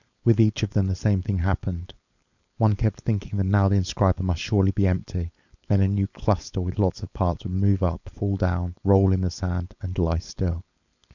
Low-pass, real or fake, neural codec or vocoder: 7.2 kHz; fake; codec, 16 kHz, 4.8 kbps, FACodec